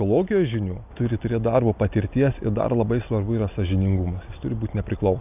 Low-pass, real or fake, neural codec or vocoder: 3.6 kHz; real; none